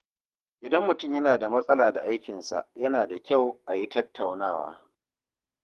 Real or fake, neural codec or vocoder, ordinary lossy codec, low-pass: fake; codec, 44.1 kHz, 2.6 kbps, SNAC; Opus, 24 kbps; 14.4 kHz